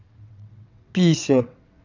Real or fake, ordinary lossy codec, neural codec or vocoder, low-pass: fake; none; vocoder, 22.05 kHz, 80 mel bands, WaveNeXt; 7.2 kHz